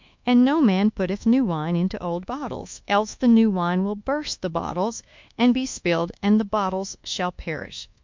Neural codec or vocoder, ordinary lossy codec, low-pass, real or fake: codec, 24 kHz, 1.2 kbps, DualCodec; MP3, 64 kbps; 7.2 kHz; fake